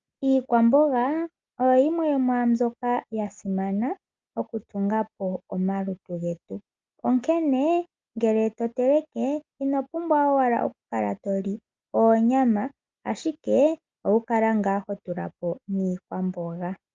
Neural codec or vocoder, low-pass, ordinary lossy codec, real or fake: none; 7.2 kHz; Opus, 24 kbps; real